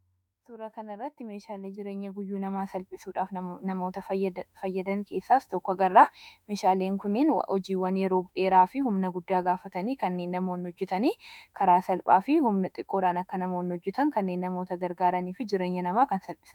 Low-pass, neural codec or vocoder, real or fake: 19.8 kHz; autoencoder, 48 kHz, 32 numbers a frame, DAC-VAE, trained on Japanese speech; fake